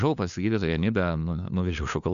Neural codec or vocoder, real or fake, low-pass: codec, 16 kHz, 2 kbps, FunCodec, trained on LibriTTS, 25 frames a second; fake; 7.2 kHz